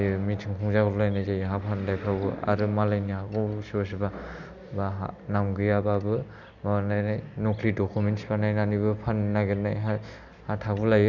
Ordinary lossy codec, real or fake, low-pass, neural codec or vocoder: none; real; 7.2 kHz; none